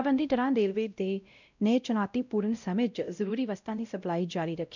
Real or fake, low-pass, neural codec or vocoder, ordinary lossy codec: fake; 7.2 kHz; codec, 16 kHz, 0.5 kbps, X-Codec, WavLM features, trained on Multilingual LibriSpeech; none